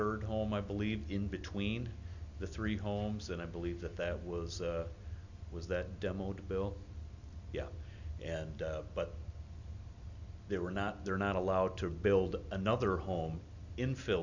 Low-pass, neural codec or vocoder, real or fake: 7.2 kHz; none; real